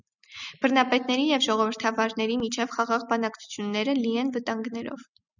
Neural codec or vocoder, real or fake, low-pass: none; real; 7.2 kHz